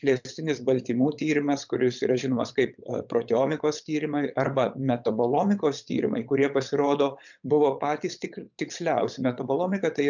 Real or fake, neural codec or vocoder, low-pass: fake; vocoder, 22.05 kHz, 80 mel bands, WaveNeXt; 7.2 kHz